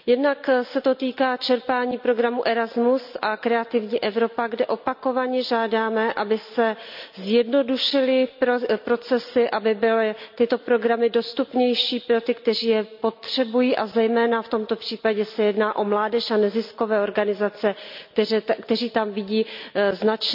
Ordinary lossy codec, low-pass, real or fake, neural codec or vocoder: MP3, 48 kbps; 5.4 kHz; real; none